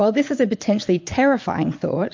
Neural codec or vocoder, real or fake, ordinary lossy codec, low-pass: vocoder, 22.05 kHz, 80 mel bands, Vocos; fake; MP3, 48 kbps; 7.2 kHz